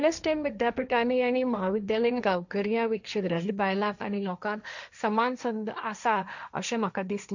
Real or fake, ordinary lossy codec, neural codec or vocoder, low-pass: fake; none; codec, 16 kHz, 1.1 kbps, Voila-Tokenizer; 7.2 kHz